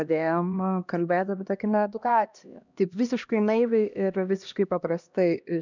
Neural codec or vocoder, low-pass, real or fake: codec, 16 kHz, 1 kbps, X-Codec, HuBERT features, trained on LibriSpeech; 7.2 kHz; fake